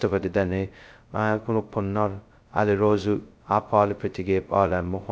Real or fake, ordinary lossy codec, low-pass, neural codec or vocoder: fake; none; none; codec, 16 kHz, 0.2 kbps, FocalCodec